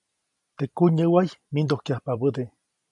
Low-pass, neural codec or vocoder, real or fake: 10.8 kHz; none; real